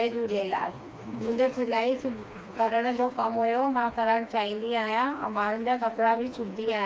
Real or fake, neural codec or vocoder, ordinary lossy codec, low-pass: fake; codec, 16 kHz, 2 kbps, FreqCodec, smaller model; none; none